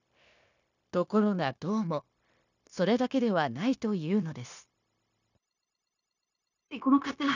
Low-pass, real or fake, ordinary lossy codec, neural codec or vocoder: 7.2 kHz; fake; none; codec, 16 kHz, 0.9 kbps, LongCat-Audio-Codec